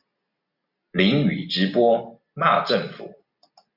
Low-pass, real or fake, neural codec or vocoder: 5.4 kHz; real; none